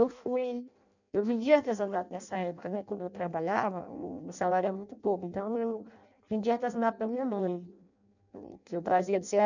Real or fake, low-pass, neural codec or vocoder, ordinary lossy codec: fake; 7.2 kHz; codec, 16 kHz in and 24 kHz out, 0.6 kbps, FireRedTTS-2 codec; none